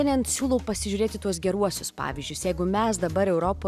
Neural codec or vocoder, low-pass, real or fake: none; 14.4 kHz; real